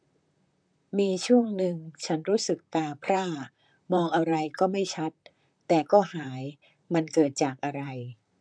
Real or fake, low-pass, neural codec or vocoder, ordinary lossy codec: fake; 9.9 kHz; vocoder, 44.1 kHz, 128 mel bands, Pupu-Vocoder; MP3, 96 kbps